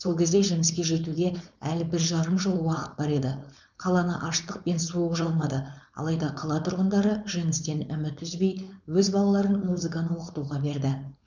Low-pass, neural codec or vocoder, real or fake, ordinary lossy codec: 7.2 kHz; codec, 16 kHz, 4.8 kbps, FACodec; fake; none